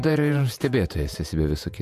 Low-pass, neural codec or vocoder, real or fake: 14.4 kHz; vocoder, 48 kHz, 128 mel bands, Vocos; fake